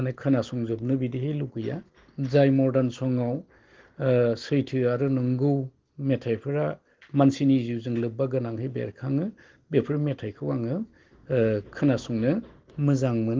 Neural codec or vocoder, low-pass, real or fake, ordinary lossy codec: none; 7.2 kHz; real; Opus, 16 kbps